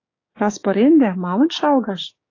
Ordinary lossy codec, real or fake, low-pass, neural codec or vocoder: AAC, 32 kbps; fake; 7.2 kHz; codec, 16 kHz, 6 kbps, DAC